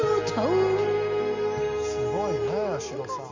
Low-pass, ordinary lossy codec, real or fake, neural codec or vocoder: 7.2 kHz; MP3, 64 kbps; real; none